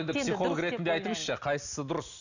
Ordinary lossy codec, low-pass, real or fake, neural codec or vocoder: none; 7.2 kHz; real; none